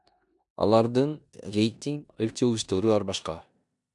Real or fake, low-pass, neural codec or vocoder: fake; 10.8 kHz; codec, 16 kHz in and 24 kHz out, 0.9 kbps, LongCat-Audio-Codec, four codebook decoder